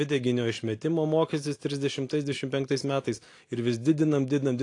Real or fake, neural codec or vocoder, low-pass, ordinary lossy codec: real; none; 10.8 kHz; AAC, 48 kbps